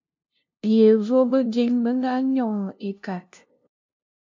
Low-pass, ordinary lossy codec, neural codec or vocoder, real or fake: 7.2 kHz; MP3, 48 kbps; codec, 16 kHz, 0.5 kbps, FunCodec, trained on LibriTTS, 25 frames a second; fake